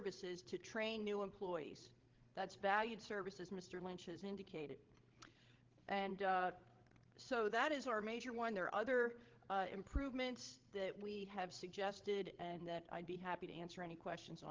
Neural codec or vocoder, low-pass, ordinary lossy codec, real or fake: codec, 16 kHz, 16 kbps, FreqCodec, larger model; 7.2 kHz; Opus, 16 kbps; fake